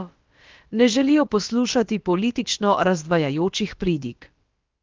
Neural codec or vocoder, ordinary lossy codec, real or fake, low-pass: codec, 16 kHz, about 1 kbps, DyCAST, with the encoder's durations; Opus, 32 kbps; fake; 7.2 kHz